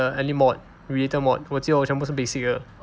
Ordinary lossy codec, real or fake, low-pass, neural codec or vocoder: none; real; none; none